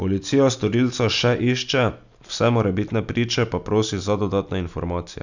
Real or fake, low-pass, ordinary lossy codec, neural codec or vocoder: real; 7.2 kHz; none; none